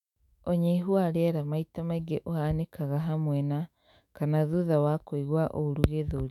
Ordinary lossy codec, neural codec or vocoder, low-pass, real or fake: none; autoencoder, 48 kHz, 128 numbers a frame, DAC-VAE, trained on Japanese speech; 19.8 kHz; fake